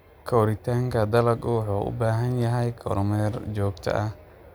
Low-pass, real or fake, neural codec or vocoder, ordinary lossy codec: none; real; none; none